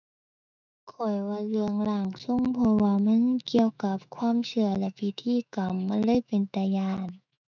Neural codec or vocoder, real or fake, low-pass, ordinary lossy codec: autoencoder, 48 kHz, 128 numbers a frame, DAC-VAE, trained on Japanese speech; fake; 7.2 kHz; none